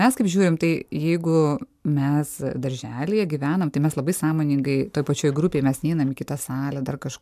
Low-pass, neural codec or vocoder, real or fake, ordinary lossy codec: 14.4 kHz; none; real; MP3, 96 kbps